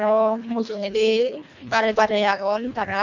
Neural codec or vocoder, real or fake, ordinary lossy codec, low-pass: codec, 24 kHz, 1.5 kbps, HILCodec; fake; none; 7.2 kHz